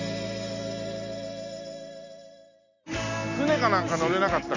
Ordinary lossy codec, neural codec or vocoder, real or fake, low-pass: none; none; real; 7.2 kHz